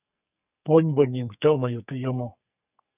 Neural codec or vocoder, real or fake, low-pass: codec, 44.1 kHz, 2.6 kbps, SNAC; fake; 3.6 kHz